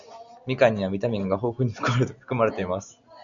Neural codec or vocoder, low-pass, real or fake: none; 7.2 kHz; real